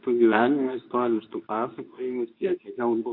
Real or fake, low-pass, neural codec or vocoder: fake; 5.4 kHz; codec, 24 kHz, 0.9 kbps, WavTokenizer, medium speech release version 2